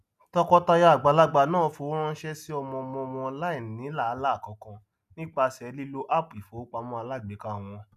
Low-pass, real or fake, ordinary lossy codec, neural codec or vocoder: 14.4 kHz; real; none; none